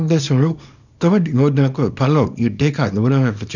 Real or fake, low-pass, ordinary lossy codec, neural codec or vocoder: fake; 7.2 kHz; none; codec, 24 kHz, 0.9 kbps, WavTokenizer, small release